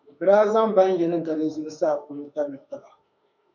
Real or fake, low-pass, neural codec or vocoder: fake; 7.2 kHz; autoencoder, 48 kHz, 32 numbers a frame, DAC-VAE, trained on Japanese speech